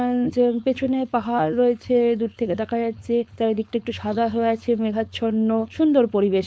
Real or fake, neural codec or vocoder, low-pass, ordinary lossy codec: fake; codec, 16 kHz, 4.8 kbps, FACodec; none; none